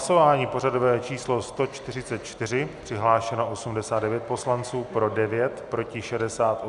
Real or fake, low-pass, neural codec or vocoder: real; 10.8 kHz; none